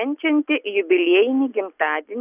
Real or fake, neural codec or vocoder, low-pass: real; none; 3.6 kHz